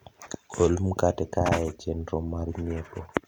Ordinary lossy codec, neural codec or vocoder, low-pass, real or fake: none; none; 19.8 kHz; real